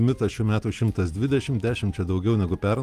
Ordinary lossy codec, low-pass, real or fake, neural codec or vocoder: Opus, 32 kbps; 14.4 kHz; real; none